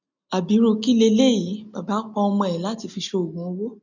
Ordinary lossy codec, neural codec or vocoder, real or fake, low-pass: none; none; real; 7.2 kHz